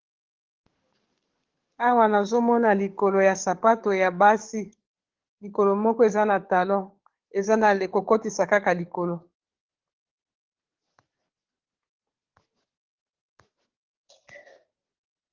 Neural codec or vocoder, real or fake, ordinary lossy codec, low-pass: codec, 44.1 kHz, 7.8 kbps, DAC; fake; Opus, 16 kbps; 7.2 kHz